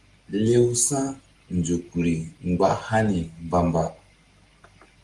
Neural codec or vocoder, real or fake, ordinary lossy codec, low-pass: none; real; Opus, 24 kbps; 10.8 kHz